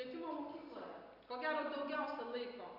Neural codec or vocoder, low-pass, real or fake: none; 5.4 kHz; real